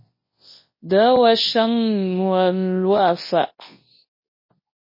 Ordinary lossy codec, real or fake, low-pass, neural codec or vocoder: MP3, 24 kbps; fake; 5.4 kHz; codec, 24 kHz, 0.9 kbps, WavTokenizer, large speech release